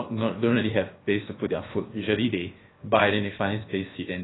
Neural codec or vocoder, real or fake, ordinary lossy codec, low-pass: codec, 16 kHz, about 1 kbps, DyCAST, with the encoder's durations; fake; AAC, 16 kbps; 7.2 kHz